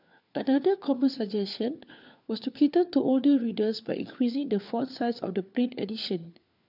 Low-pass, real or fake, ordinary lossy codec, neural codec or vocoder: 5.4 kHz; fake; none; codec, 16 kHz, 4 kbps, FunCodec, trained on LibriTTS, 50 frames a second